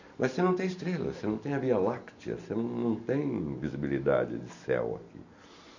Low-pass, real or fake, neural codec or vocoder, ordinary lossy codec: 7.2 kHz; real; none; none